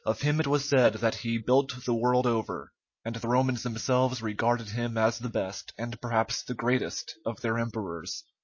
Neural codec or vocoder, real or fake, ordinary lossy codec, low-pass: none; real; MP3, 32 kbps; 7.2 kHz